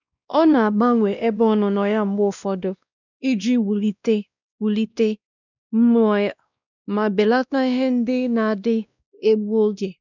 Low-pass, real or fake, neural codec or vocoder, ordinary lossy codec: 7.2 kHz; fake; codec, 16 kHz, 1 kbps, X-Codec, WavLM features, trained on Multilingual LibriSpeech; none